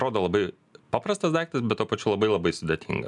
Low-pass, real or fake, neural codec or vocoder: 10.8 kHz; real; none